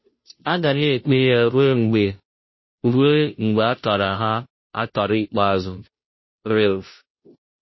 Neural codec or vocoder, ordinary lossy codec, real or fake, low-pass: codec, 16 kHz, 0.5 kbps, FunCodec, trained on Chinese and English, 25 frames a second; MP3, 24 kbps; fake; 7.2 kHz